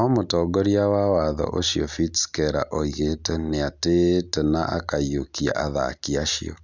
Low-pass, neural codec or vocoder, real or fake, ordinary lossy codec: 7.2 kHz; none; real; none